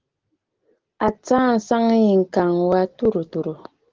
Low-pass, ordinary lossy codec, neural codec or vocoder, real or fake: 7.2 kHz; Opus, 16 kbps; none; real